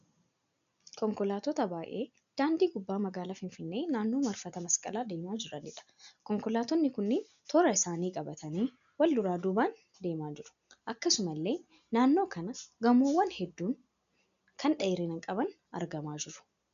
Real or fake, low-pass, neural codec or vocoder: real; 7.2 kHz; none